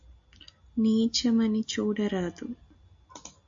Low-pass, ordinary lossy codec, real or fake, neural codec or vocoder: 7.2 kHz; AAC, 64 kbps; real; none